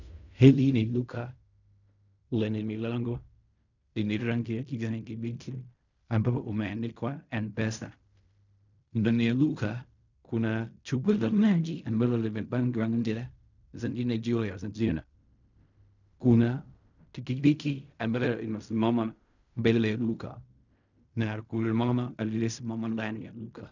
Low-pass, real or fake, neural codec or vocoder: 7.2 kHz; fake; codec, 16 kHz in and 24 kHz out, 0.4 kbps, LongCat-Audio-Codec, fine tuned four codebook decoder